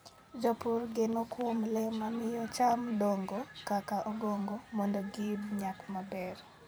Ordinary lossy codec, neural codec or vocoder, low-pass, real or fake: none; vocoder, 44.1 kHz, 128 mel bands every 512 samples, BigVGAN v2; none; fake